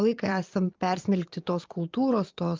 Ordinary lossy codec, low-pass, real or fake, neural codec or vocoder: Opus, 24 kbps; 7.2 kHz; fake; vocoder, 22.05 kHz, 80 mel bands, Vocos